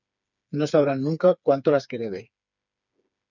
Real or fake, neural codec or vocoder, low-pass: fake; codec, 16 kHz, 4 kbps, FreqCodec, smaller model; 7.2 kHz